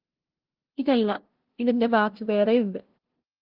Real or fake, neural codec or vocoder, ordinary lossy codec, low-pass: fake; codec, 16 kHz, 0.5 kbps, FunCodec, trained on LibriTTS, 25 frames a second; Opus, 16 kbps; 5.4 kHz